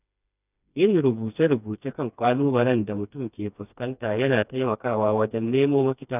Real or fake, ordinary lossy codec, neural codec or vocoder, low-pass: fake; none; codec, 16 kHz, 2 kbps, FreqCodec, smaller model; 3.6 kHz